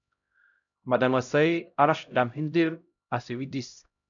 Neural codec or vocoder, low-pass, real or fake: codec, 16 kHz, 0.5 kbps, X-Codec, HuBERT features, trained on LibriSpeech; 7.2 kHz; fake